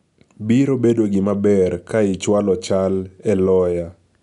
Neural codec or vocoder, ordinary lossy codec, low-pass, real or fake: none; none; 10.8 kHz; real